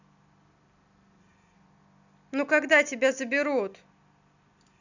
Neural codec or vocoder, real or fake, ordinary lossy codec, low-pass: none; real; none; 7.2 kHz